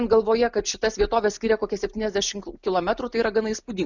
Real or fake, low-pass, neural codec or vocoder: real; 7.2 kHz; none